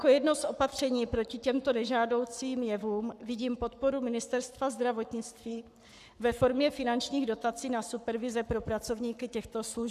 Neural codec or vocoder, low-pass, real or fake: codec, 44.1 kHz, 7.8 kbps, Pupu-Codec; 14.4 kHz; fake